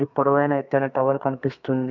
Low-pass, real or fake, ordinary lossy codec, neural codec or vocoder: 7.2 kHz; fake; MP3, 64 kbps; codec, 32 kHz, 1.9 kbps, SNAC